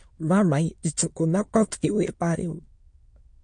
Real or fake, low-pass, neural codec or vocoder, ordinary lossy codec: fake; 9.9 kHz; autoencoder, 22.05 kHz, a latent of 192 numbers a frame, VITS, trained on many speakers; MP3, 48 kbps